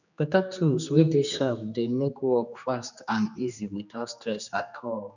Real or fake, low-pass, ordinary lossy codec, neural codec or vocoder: fake; 7.2 kHz; none; codec, 16 kHz, 2 kbps, X-Codec, HuBERT features, trained on general audio